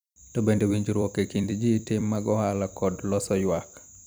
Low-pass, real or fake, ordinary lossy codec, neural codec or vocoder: none; fake; none; vocoder, 44.1 kHz, 128 mel bands every 256 samples, BigVGAN v2